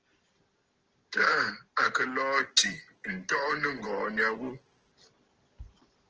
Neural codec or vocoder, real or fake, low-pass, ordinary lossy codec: none; real; 7.2 kHz; Opus, 16 kbps